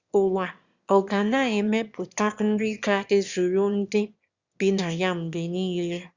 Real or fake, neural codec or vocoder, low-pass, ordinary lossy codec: fake; autoencoder, 22.05 kHz, a latent of 192 numbers a frame, VITS, trained on one speaker; 7.2 kHz; Opus, 64 kbps